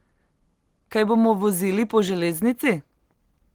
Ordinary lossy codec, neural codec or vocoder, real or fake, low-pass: Opus, 16 kbps; none; real; 19.8 kHz